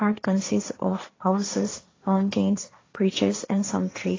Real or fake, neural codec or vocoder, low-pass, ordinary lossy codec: fake; codec, 16 kHz, 1.1 kbps, Voila-Tokenizer; 7.2 kHz; AAC, 32 kbps